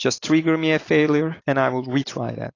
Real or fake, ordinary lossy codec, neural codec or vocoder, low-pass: real; AAC, 32 kbps; none; 7.2 kHz